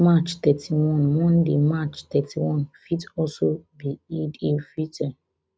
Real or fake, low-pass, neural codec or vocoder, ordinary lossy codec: real; none; none; none